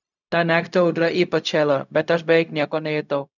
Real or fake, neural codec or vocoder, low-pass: fake; codec, 16 kHz, 0.4 kbps, LongCat-Audio-Codec; 7.2 kHz